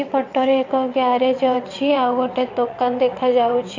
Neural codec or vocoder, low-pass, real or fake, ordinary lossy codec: vocoder, 22.05 kHz, 80 mel bands, WaveNeXt; 7.2 kHz; fake; AAC, 48 kbps